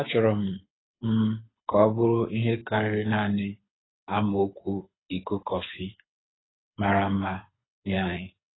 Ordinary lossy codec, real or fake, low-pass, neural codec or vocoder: AAC, 16 kbps; fake; 7.2 kHz; codec, 24 kHz, 6 kbps, HILCodec